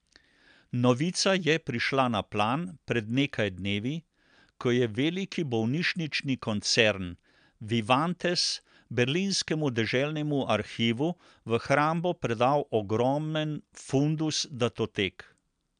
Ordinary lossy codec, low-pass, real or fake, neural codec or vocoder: MP3, 96 kbps; 9.9 kHz; real; none